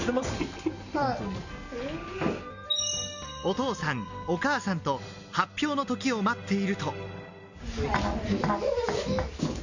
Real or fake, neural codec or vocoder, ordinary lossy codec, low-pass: real; none; none; 7.2 kHz